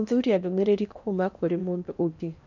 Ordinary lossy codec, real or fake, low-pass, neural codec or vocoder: none; fake; 7.2 kHz; codec, 16 kHz in and 24 kHz out, 0.8 kbps, FocalCodec, streaming, 65536 codes